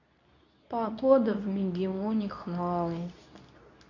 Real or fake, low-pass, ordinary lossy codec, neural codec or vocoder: fake; 7.2 kHz; none; codec, 24 kHz, 0.9 kbps, WavTokenizer, medium speech release version 2